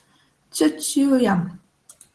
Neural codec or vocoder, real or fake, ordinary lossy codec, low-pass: none; real; Opus, 16 kbps; 10.8 kHz